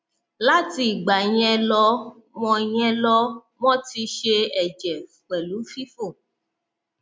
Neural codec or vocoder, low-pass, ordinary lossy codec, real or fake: none; none; none; real